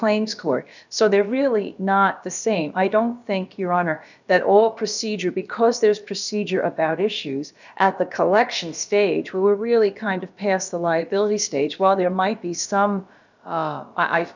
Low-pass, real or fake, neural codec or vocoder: 7.2 kHz; fake; codec, 16 kHz, about 1 kbps, DyCAST, with the encoder's durations